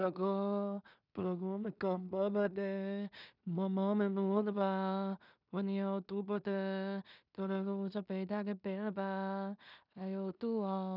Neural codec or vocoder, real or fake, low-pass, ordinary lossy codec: codec, 16 kHz in and 24 kHz out, 0.4 kbps, LongCat-Audio-Codec, two codebook decoder; fake; 5.4 kHz; none